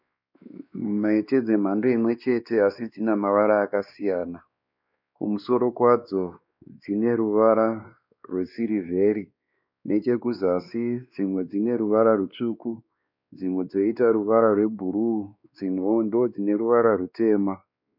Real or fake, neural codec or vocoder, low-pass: fake; codec, 16 kHz, 2 kbps, X-Codec, WavLM features, trained on Multilingual LibriSpeech; 5.4 kHz